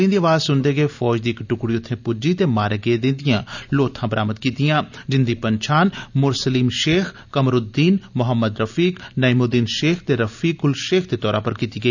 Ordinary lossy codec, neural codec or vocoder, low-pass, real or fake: none; none; 7.2 kHz; real